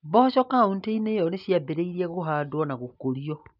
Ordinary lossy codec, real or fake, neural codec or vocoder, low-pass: none; real; none; 5.4 kHz